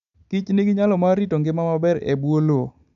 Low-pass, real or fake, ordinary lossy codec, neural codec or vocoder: 7.2 kHz; real; none; none